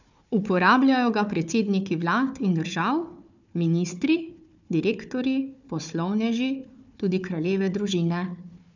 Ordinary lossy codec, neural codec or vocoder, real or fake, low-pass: none; codec, 16 kHz, 4 kbps, FunCodec, trained on Chinese and English, 50 frames a second; fake; 7.2 kHz